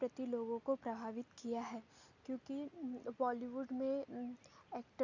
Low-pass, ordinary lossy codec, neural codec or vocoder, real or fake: 7.2 kHz; none; none; real